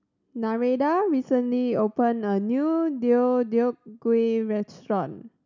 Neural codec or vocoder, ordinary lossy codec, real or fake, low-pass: none; none; real; 7.2 kHz